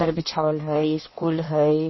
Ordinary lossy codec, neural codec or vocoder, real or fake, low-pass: MP3, 24 kbps; codec, 16 kHz in and 24 kHz out, 1.1 kbps, FireRedTTS-2 codec; fake; 7.2 kHz